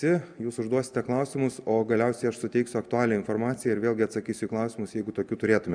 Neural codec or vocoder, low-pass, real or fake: none; 9.9 kHz; real